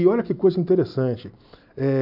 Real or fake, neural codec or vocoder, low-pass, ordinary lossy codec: real; none; 5.4 kHz; AAC, 48 kbps